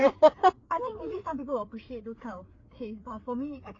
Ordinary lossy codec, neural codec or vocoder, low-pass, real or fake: AAC, 32 kbps; codec, 16 kHz, 4 kbps, FreqCodec, larger model; 7.2 kHz; fake